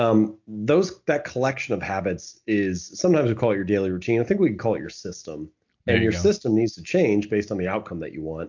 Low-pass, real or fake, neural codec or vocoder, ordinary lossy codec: 7.2 kHz; real; none; MP3, 48 kbps